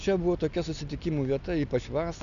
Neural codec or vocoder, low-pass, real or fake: none; 7.2 kHz; real